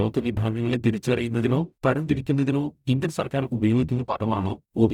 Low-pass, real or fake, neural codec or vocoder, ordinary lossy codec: 19.8 kHz; fake; codec, 44.1 kHz, 0.9 kbps, DAC; none